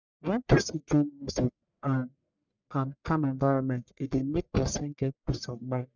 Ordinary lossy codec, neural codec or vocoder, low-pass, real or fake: none; codec, 44.1 kHz, 1.7 kbps, Pupu-Codec; 7.2 kHz; fake